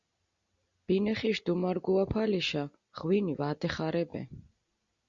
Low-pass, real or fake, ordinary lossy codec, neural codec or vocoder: 7.2 kHz; real; Opus, 64 kbps; none